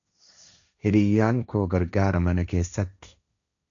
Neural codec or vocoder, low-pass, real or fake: codec, 16 kHz, 1.1 kbps, Voila-Tokenizer; 7.2 kHz; fake